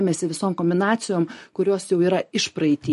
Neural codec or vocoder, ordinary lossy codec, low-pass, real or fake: none; MP3, 48 kbps; 14.4 kHz; real